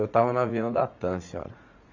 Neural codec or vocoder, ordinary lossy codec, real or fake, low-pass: vocoder, 44.1 kHz, 80 mel bands, Vocos; AAC, 48 kbps; fake; 7.2 kHz